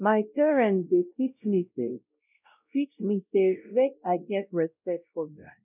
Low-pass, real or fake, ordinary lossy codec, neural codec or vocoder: 3.6 kHz; fake; none; codec, 16 kHz, 0.5 kbps, X-Codec, WavLM features, trained on Multilingual LibriSpeech